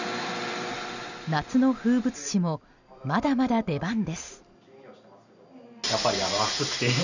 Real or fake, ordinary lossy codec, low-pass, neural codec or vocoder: real; none; 7.2 kHz; none